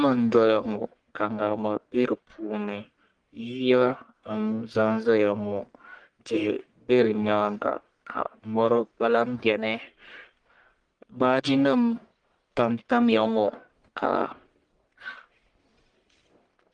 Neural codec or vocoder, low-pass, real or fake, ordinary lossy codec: codec, 44.1 kHz, 1.7 kbps, Pupu-Codec; 9.9 kHz; fake; Opus, 24 kbps